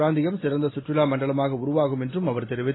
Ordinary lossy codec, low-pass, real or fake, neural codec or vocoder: AAC, 16 kbps; 7.2 kHz; real; none